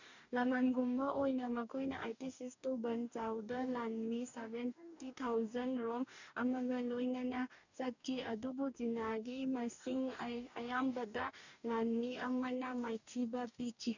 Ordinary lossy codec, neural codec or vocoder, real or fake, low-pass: none; codec, 44.1 kHz, 2.6 kbps, DAC; fake; 7.2 kHz